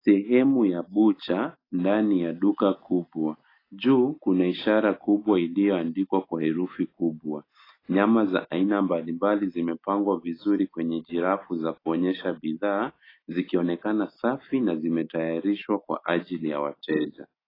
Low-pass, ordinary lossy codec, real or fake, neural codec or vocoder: 5.4 kHz; AAC, 24 kbps; real; none